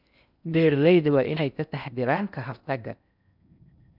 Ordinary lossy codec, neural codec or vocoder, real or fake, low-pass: none; codec, 16 kHz in and 24 kHz out, 0.6 kbps, FocalCodec, streaming, 4096 codes; fake; 5.4 kHz